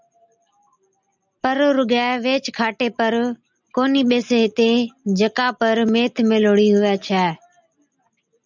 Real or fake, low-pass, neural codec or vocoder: real; 7.2 kHz; none